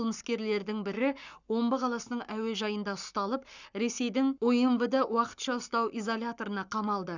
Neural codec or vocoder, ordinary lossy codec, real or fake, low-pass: codec, 44.1 kHz, 7.8 kbps, Pupu-Codec; none; fake; 7.2 kHz